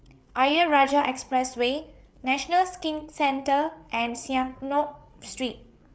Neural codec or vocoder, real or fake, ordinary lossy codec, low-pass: codec, 16 kHz, 8 kbps, FreqCodec, larger model; fake; none; none